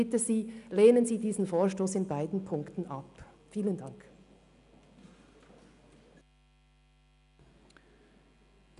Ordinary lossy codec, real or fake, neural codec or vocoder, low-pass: none; real; none; 10.8 kHz